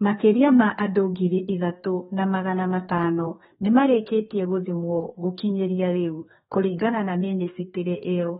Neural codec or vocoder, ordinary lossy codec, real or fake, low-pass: codec, 32 kHz, 1.9 kbps, SNAC; AAC, 16 kbps; fake; 14.4 kHz